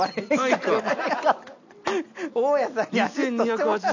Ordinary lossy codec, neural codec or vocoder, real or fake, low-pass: none; none; real; 7.2 kHz